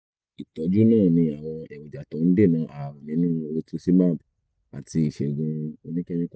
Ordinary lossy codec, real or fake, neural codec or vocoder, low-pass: none; real; none; none